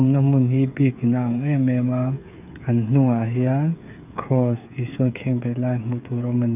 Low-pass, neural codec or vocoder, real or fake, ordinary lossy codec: 3.6 kHz; codec, 16 kHz, 8 kbps, FreqCodec, smaller model; fake; none